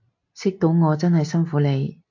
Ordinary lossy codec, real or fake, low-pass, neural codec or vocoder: AAC, 48 kbps; real; 7.2 kHz; none